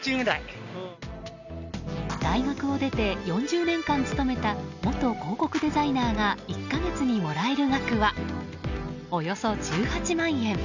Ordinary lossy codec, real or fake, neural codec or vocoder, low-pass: none; real; none; 7.2 kHz